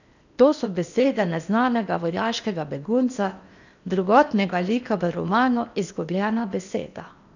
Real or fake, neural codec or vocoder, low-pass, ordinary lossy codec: fake; codec, 16 kHz in and 24 kHz out, 0.8 kbps, FocalCodec, streaming, 65536 codes; 7.2 kHz; none